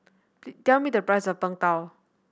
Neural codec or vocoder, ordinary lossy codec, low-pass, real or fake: none; none; none; real